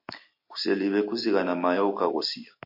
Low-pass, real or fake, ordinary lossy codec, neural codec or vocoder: 5.4 kHz; real; MP3, 32 kbps; none